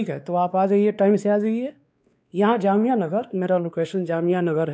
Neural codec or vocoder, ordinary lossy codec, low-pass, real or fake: codec, 16 kHz, 2 kbps, X-Codec, WavLM features, trained on Multilingual LibriSpeech; none; none; fake